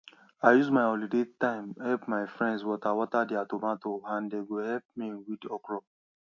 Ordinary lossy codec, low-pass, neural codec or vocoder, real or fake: MP3, 48 kbps; 7.2 kHz; none; real